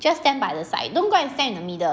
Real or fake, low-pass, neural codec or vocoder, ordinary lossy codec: real; none; none; none